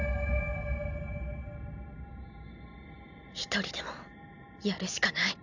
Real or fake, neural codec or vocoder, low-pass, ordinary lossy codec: real; none; 7.2 kHz; none